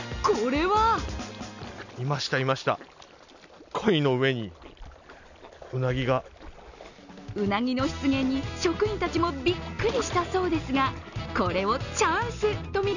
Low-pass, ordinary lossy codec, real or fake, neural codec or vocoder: 7.2 kHz; none; real; none